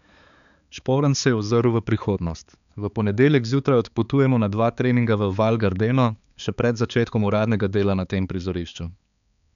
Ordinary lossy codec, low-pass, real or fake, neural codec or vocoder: none; 7.2 kHz; fake; codec, 16 kHz, 4 kbps, X-Codec, HuBERT features, trained on balanced general audio